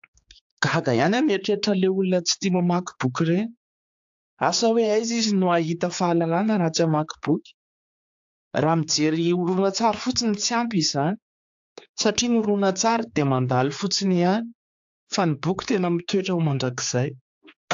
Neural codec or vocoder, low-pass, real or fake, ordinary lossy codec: codec, 16 kHz, 4 kbps, X-Codec, HuBERT features, trained on general audio; 7.2 kHz; fake; AAC, 64 kbps